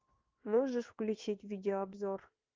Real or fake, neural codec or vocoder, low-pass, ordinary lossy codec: fake; codec, 16 kHz in and 24 kHz out, 1 kbps, XY-Tokenizer; 7.2 kHz; Opus, 32 kbps